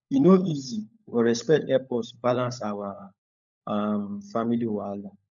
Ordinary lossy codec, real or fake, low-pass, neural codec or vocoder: none; fake; 7.2 kHz; codec, 16 kHz, 16 kbps, FunCodec, trained on LibriTTS, 50 frames a second